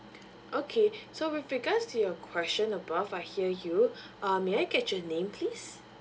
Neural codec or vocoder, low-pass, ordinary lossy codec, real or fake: none; none; none; real